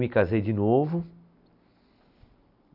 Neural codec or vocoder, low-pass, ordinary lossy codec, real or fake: none; 5.4 kHz; none; real